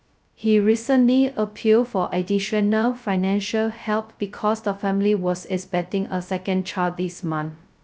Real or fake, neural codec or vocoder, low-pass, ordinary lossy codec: fake; codec, 16 kHz, 0.2 kbps, FocalCodec; none; none